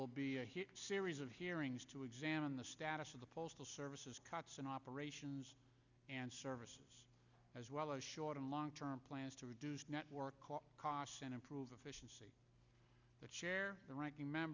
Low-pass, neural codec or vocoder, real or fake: 7.2 kHz; none; real